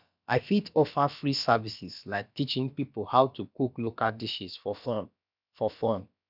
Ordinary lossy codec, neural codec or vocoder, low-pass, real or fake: none; codec, 16 kHz, about 1 kbps, DyCAST, with the encoder's durations; 5.4 kHz; fake